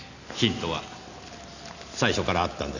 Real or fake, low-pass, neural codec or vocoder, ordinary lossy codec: real; 7.2 kHz; none; none